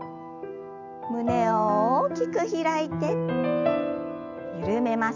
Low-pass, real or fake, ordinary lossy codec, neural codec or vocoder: 7.2 kHz; real; none; none